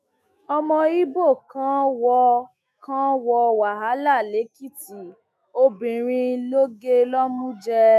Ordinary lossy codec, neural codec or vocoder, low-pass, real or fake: none; autoencoder, 48 kHz, 128 numbers a frame, DAC-VAE, trained on Japanese speech; 14.4 kHz; fake